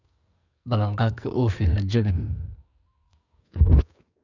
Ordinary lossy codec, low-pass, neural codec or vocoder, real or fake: none; 7.2 kHz; codec, 44.1 kHz, 2.6 kbps, SNAC; fake